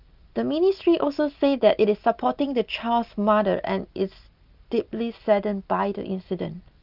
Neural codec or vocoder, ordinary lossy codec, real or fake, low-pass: none; Opus, 24 kbps; real; 5.4 kHz